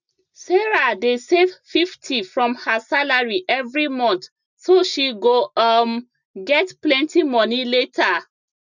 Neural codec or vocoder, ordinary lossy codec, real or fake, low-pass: none; none; real; 7.2 kHz